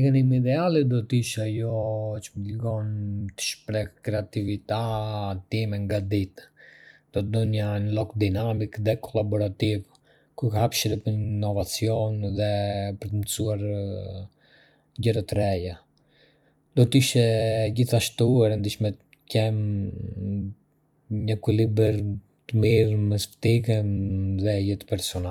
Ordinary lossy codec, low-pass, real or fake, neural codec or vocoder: none; 19.8 kHz; fake; vocoder, 44.1 kHz, 128 mel bands every 256 samples, BigVGAN v2